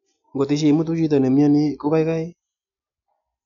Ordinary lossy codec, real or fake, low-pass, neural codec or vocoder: none; real; 7.2 kHz; none